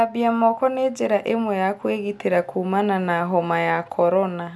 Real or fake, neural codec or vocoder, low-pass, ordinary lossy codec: real; none; none; none